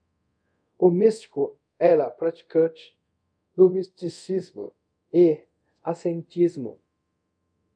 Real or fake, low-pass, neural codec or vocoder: fake; 9.9 kHz; codec, 24 kHz, 0.5 kbps, DualCodec